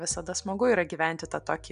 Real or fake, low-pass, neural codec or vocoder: real; 9.9 kHz; none